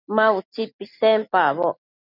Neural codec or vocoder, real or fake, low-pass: none; real; 5.4 kHz